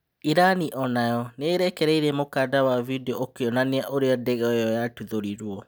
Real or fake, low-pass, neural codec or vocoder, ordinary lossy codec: real; none; none; none